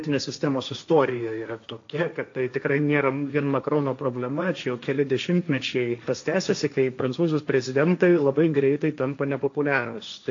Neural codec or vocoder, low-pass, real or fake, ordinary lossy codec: codec, 16 kHz, 1.1 kbps, Voila-Tokenizer; 7.2 kHz; fake; AAC, 48 kbps